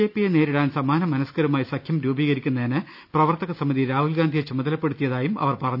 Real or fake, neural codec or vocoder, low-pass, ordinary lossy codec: real; none; 5.4 kHz; none